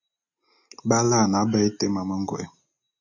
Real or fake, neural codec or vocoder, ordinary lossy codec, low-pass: real; none; AAC, 48 kbps; 7.2 kHz